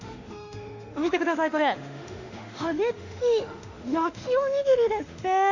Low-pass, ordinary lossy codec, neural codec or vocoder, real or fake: 7.2 kHz; none; autoencoder, 48 kHz, 32 numbers a frame, DAC-VAE, trained on Japanese speech; fake